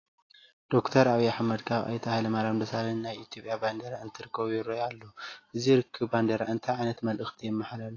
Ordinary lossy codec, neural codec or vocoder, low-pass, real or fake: AAC, 32 kbps; none; 7.2 kHz; real